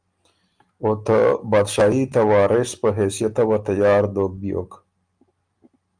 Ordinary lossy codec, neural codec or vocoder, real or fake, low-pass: Opus, 32 kbps; none; real; 9.9 kHz